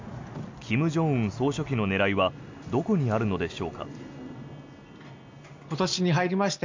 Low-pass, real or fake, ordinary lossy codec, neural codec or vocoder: 7.2 kHz; real; MP3, 64 kbps; none